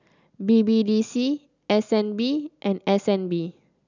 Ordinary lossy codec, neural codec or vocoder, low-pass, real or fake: none; none; 7.2 kHz; real